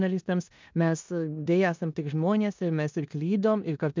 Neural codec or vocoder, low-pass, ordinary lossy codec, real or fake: codec, 16 kHz in and 24 kHz out, 0.9 kbps, LongCat-Audio-Codec, four codebook decoder; 7.2 kHz; MP3, 64 kbps; fake